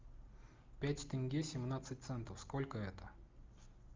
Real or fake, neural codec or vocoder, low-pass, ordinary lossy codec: real; none; 7.2 kHz; Opus, 24 kbps